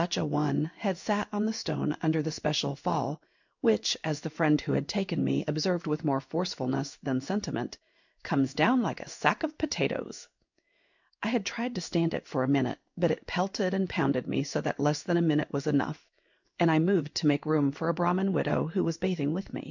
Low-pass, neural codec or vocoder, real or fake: 7.2 kHz; codec, 16 kHz in and 24 kHz out, 1 kbps, XY-Tokenizer; fake